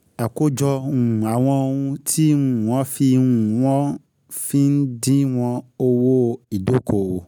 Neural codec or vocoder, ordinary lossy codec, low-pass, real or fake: none; none; none; real